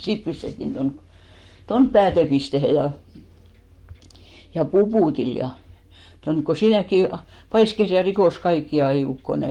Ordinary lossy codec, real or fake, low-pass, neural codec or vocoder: Opus, 24 kbps; fake; 19.8 kHz; codec, 44.1 kHz, 7.8 kbps, Pupu-Codec